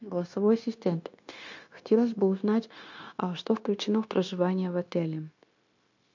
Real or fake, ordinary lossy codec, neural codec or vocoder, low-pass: fake; MP3, 48 kbps; codec, 16 kHz, 0.9 kbps, LongCat-Audio-Codec; 7.2 kHz